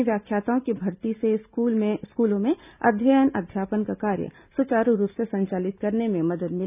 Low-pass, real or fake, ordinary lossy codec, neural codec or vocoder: 3.6 kHz; real; none; none